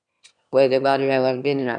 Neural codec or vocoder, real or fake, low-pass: autoencoder, 22.05 kHz, a latent of 192 numbers a frame, VITS, trained on one speaker; fake; 9.9 kHz